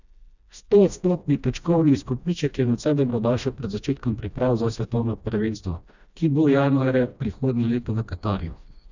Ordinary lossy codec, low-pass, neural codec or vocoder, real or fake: none; 7.2 kHz; codec, 16 kHz, 1 kbps, FreqCodec, smaller model; fake